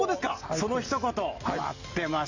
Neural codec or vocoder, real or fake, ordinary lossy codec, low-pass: none; real; Opus, 64 kbps; 7.2 kHz